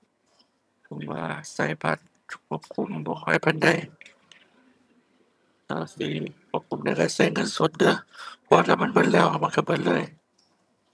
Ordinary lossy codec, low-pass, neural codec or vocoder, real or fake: none; none; vocoder, 22.05 kHz, 80 mel bands, HiFi-GAN; fake